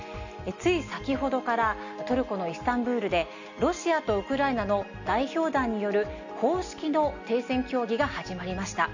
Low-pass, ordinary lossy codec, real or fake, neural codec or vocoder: 7.2 kHz; none; real; none